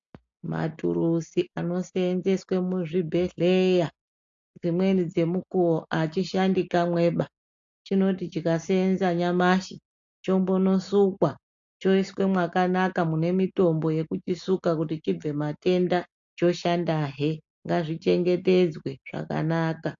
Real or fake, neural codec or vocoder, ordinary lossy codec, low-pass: real; none; AAC, 64 kbps; 7.2 kHz